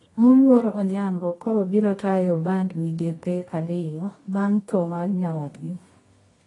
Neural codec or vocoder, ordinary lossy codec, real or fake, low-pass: codec, 24 kHz, 0.9 kbps, WavTokenizer, medium music audio release; AAC, 32 kbps; fake; 10.8 kHz